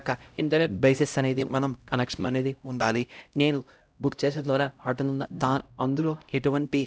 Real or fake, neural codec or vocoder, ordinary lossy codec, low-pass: fake; codec, 16 kHz, 0.5 kbps, X-Codec, HuBERT features, trained on LibriSpeech; none; none